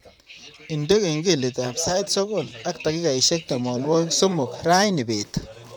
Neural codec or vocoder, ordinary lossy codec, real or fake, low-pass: vocoder, 44.1 kHz, 128 mel bands, Pupu-Vocoder; none; fake; none